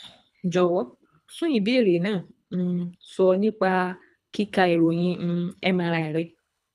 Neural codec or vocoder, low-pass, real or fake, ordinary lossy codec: codec, 24 kHz, 3 kbps, HILCodec; none; fake; none